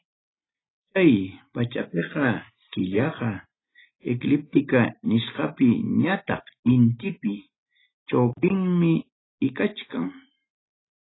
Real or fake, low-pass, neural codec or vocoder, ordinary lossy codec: real; 7.2 kHz; none; AAC, 16 kbps